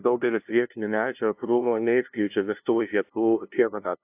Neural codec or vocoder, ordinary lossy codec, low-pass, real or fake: codec, 16 kHz, 0.5 kbps, FunCodec, trained on LibriTTS, 25 frames a second; AAC, 32 kbps; 3.6 kHz; fake